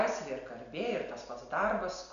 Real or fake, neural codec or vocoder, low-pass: real; none; 7.2 kHz